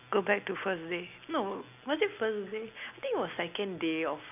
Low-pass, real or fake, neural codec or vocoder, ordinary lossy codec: 3.6 kHz; real; none; none